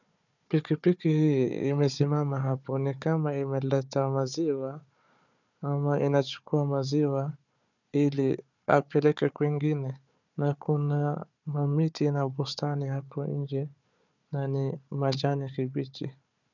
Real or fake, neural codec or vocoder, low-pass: fake; codec, 16 kHz, 4 kbps, FunCodec, trained on Chinese and English, 50 frames a second; 7.2 kHz